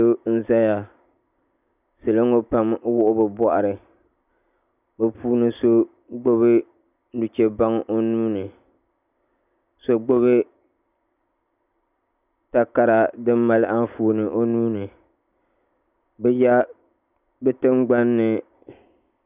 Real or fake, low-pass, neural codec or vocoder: real; 3.6 kHz; none